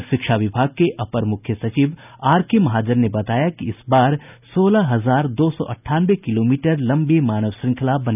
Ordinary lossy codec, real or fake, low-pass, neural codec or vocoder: none; real; 3.6 kHz; none